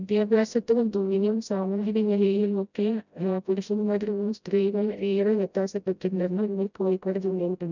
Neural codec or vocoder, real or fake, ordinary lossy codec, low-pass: codec, 16 kHz, 0.5 kbps, FreqCodec, smaller model; fake; none; 7.2 kHz